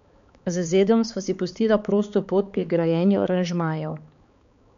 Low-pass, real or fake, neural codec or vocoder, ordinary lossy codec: 7.2 kHz; fake; codec, 16 kHz, 4 kbps, X-Codec, HuBERT features, trained on balanced general audio; MP3, 64 kbps